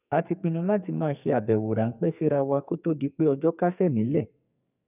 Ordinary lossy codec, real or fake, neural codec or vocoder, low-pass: none; fake; codec, 44.1 kHz, 2.6 kbps, SNAC; 3.6 kHz